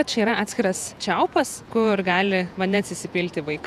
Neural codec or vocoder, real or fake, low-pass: vocoder, 48 kHz, 128 mel bands, Vocos; fake; 14.4 kHz